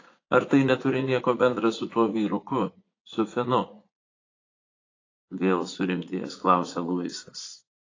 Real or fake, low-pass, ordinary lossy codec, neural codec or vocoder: fake; 7.2 kHz; AAC, 32 kbps; vocoder, 44.1 kHz, 80 mel bands, Vocos